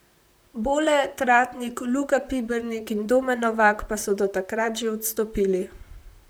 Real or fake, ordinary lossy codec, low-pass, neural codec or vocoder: fake; none; none; vocoder, 44.1 kHz, 128 mel bands, Pupu-Vocoder